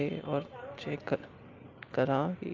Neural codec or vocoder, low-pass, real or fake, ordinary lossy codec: none; 7.2 kHz; real; Opus, 32 kbps